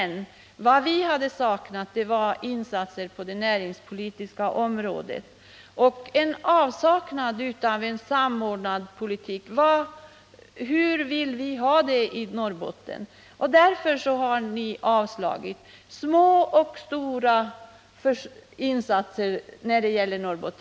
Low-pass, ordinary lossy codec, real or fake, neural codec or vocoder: none; none; real; none